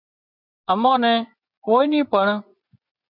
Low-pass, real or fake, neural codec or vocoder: 5.4 kHz; fake; vocoder, 24 kHz, 100 mel bands, Vocos